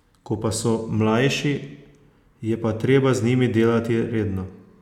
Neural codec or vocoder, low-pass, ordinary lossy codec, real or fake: none; 19.8 kHz; none; real